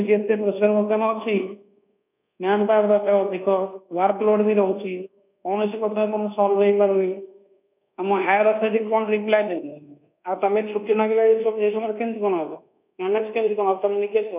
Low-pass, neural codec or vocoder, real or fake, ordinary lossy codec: 3.6 kHz; codec, 24 kHz, 1.2 kbps, DualCodec; fake; none